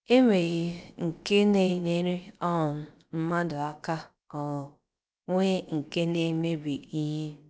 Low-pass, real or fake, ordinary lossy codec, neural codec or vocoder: none; fake; none; codec, 16 kHz, about 1 kbps, DyCAST, with the encoder's durations